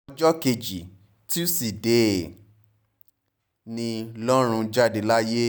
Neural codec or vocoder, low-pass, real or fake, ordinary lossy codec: none; none; real; none